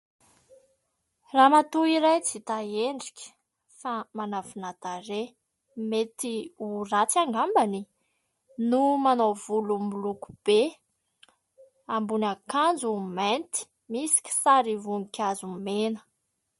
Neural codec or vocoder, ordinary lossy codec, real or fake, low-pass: none; MP3, 48 kbps; real; 19.8 kHz